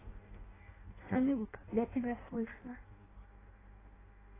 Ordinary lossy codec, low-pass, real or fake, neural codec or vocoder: AAC, 16 kbps; 3.6 kHz; fake; codec, 16 kHz in and 24 kHz out, 0.6 kbps, FireRedTTS-2 codec